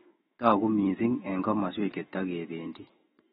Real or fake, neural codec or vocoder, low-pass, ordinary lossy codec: fake; autoencoder, 48 kHz, 128 numbers a frame, DAC-VAE, trained on Japanese speech; 19.8 kHz; AAC, 16 kbps